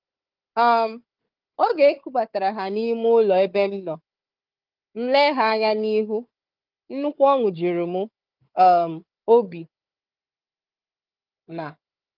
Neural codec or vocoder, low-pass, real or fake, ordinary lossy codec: codec, 16 kHz, 4 kbps, FunCodec, trained on Chinese and English, 50 frames a second; 5.4 kHz; fake; Opus, 32 kbps